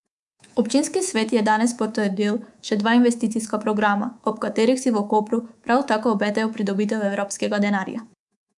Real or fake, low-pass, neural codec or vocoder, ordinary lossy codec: fake; 10.8 kHz; codec, 24 kHz, 3.1 kbps, DualCodec; none